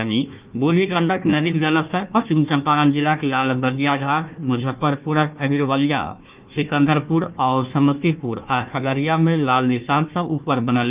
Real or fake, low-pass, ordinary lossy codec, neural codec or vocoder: fake; 3.6 kHz; Opus, 24 kbps; codec, 16 kHz, 1 kbps, FunCodec, trained on Chinese and English, 50 frames a second